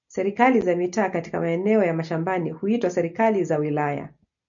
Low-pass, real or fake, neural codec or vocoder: 7.2 kHz; real; none